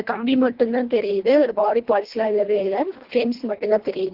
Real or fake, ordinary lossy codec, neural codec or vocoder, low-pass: fake; Opus, 16 kbps; codec, 24 kHz, 1.5 kbps, HILCodec; 5.4 kHz